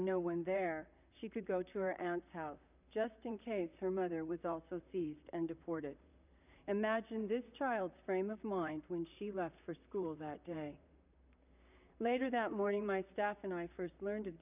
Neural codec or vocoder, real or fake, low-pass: vocoder, 44.1 kHz, 128 mel bands, Pupu-Vocoder; fake; 3.6 kHz